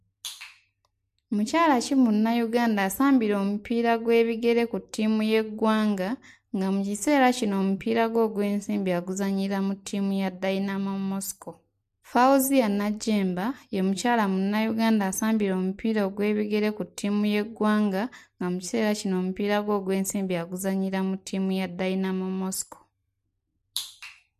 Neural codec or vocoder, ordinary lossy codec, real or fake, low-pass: none; MP3, 64 kbps; real; 14.4 kHz